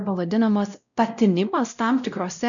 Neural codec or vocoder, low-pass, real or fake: codec, 16 kHz, 0.5 kbps, X-Codec, WavLM features, trained on Multilingual LibriSpeech; 7.2 kHz; fake